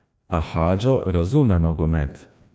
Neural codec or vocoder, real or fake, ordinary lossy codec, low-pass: codec, 16 kHz, 1 kbps, FreqCodec, larger model; fake; none; none